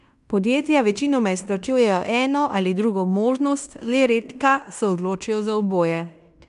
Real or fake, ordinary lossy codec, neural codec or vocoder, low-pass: fake; none; codec, 16 kHz in and 24 kHz out, 0.9 kbps, LongCat-Audio-Codec, fine tuned four codebook decoder; 10.8 kHz